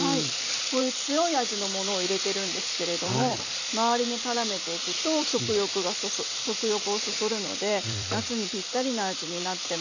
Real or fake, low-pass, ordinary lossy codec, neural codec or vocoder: fake; 7.2 kHz; none; vocoder, 44.1 kHz, 128 mel bands every 256 samples, BigVGAN v2